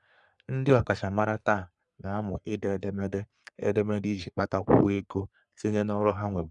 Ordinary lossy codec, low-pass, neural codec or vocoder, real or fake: none; 10.8 kHz; codec, 44.1 kHz, 3.4 kbps, Pupu-Codec; fake